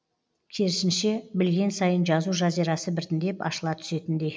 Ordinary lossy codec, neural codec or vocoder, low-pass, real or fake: none; none; none; real